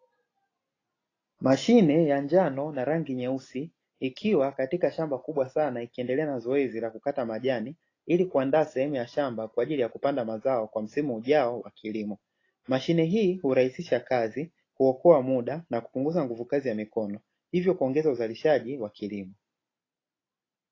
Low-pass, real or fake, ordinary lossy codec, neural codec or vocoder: 7.2 kHz; real; AAC, 32 kbps; none